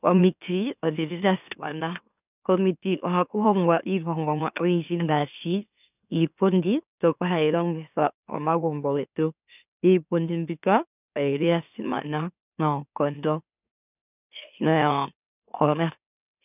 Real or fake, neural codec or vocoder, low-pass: fake; autoencoder, 44.1 kHz, a latent of 192 numbers a frame, MeloTTS; 3.6 kHz